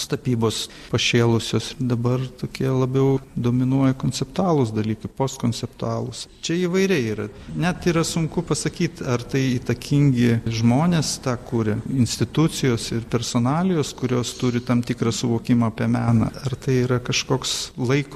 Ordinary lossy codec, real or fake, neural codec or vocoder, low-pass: MP3, 64 kbps; real; none; 14.4 kHz